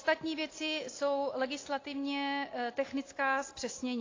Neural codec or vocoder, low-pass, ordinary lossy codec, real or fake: none; 7.2 kHz; AAC, 32 kbps; real